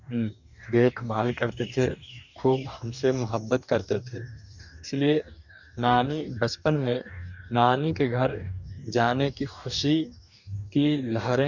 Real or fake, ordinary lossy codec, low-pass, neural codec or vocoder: fake; none; 7.2 kHz; codec, 44.1 kHz, 2.6 kbps, DAC